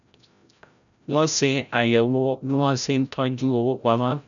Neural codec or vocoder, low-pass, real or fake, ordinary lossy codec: codec, 16 kHz, 0.5 kbps, FreqCodec, larger model; 7.2 kHz; fake; none